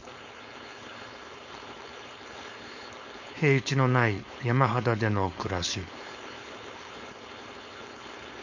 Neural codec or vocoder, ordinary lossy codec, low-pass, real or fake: codec, 16 kHz, 4.8 kbps, FACodec; MP3, 64 kbps; 7.2 kHz; fake